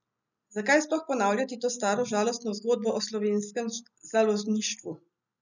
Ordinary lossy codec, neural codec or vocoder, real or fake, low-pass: none; none; real; 7.2 kHz